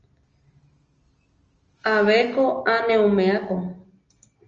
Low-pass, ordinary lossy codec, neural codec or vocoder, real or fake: 7.2 kHz; Opus, 24 kbps; none; real